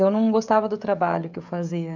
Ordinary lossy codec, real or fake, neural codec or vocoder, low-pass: none; fake; codec, 16 kHz, 16 kbps, FreqCodec, smaller model; 7.2 kHz